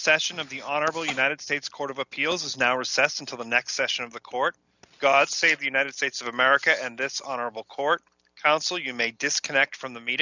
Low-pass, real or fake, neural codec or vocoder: 7.2 kHz; real; none